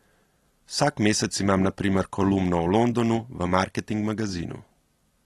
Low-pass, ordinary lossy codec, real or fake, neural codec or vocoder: 19.8 kHz; AAC, 32 kbps; real; none